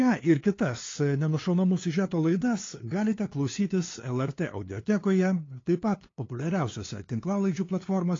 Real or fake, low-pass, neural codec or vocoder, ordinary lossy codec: fake; 7.2 kHz; codec, 16 kHz, 2 kbps, FunCodec, trained on LibriTTS, 25 frames a second; AAC, 32 kbps